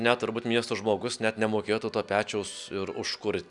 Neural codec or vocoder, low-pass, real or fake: none; 10.8 kHz; real